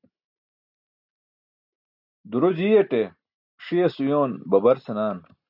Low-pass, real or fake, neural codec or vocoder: 5.4 kHz; real; none